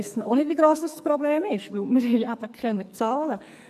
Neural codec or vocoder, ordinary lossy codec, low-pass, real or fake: codec, 44.1 kHz, 2.6 kbps, SNAC; AAC, 96 kbps; 14.4 kHz; fake